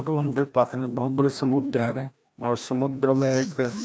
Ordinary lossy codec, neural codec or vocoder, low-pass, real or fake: none; codec, 16 kHz, 1 kbps, FreqCodec, larger model; none; fake